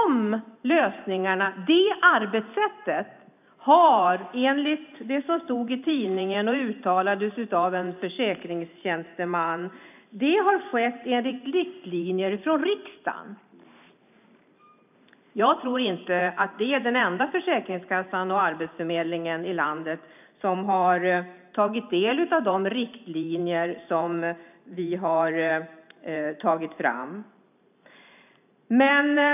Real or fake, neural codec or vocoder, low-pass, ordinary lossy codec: fake; vocoder, 44.1 kHz, 128 mel bands every 512 samples, BigVGAN v2; 3.6 kHz; none